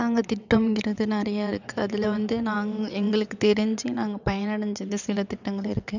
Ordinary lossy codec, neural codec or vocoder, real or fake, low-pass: none; vocoder, 22.05 kHz, 80 mel bands, WaveNeXt; fake; 7.2 kHz